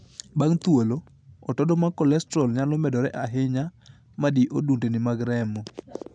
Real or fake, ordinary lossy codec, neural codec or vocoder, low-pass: real; none; none; 9.9 kHz